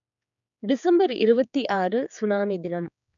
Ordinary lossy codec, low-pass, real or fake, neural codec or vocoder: none; 7.2 kHz; fake; codec, 16 kHz, 4 kbps, X-Codec, HuBERT features, trained on general audio